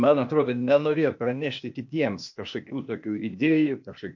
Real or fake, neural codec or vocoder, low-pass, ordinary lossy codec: fake; codec, 16 kHz, 0.8 kbps, ZipCodec; 7.2 kHz; MP3, 48 kbps